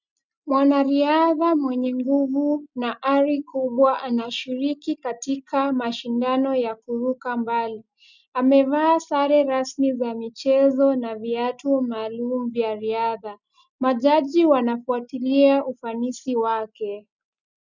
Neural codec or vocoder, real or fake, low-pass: none; real; 7.2 kHz